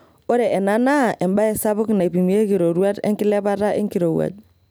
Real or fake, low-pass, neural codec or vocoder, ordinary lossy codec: real; none; none; none